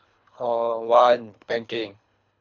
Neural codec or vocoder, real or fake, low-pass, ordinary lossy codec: codec, 24 kHz, 3 kbps, HILCodec; fake; 7.2 kHz; none